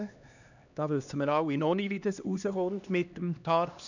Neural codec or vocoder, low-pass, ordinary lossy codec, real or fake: codec, 16 kHz, 2 kbps, X-Codec, HuBERT features, trained on LibriSpeech; 7.2 kHz; none; fake